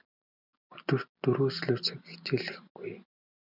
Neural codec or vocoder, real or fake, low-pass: none; real; 5.4 kHz